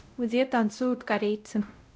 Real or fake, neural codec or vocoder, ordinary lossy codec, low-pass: fake; codec, 16 kHz, 0.5 kbps, X-Codec, WavLM features, trained on Multilingual LibriSpeech; none; none